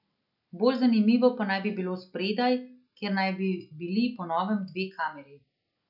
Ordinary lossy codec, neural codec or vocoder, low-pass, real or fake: none; none; 5.4 kHz; real